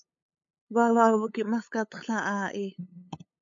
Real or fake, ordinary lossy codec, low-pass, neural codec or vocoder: fake; MP3, 48 kbps; 7.2 kHz; codec, 16 kHz, 8 kbps, FunCodec, trained on LibriTTS, 25 frames a second